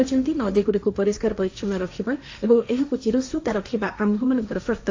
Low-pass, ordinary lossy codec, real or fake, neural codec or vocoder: 7.2 kHz; AAC, 48 kbps; fake; codec, 16 kHz, 1.1 kbps, Voila-Tokenizer